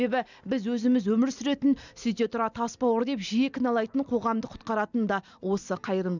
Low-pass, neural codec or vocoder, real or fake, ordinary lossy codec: 7.2 kHz; none; real; none